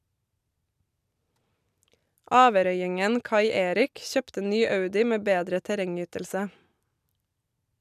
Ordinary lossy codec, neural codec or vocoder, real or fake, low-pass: none; none; real; 14.4 kHz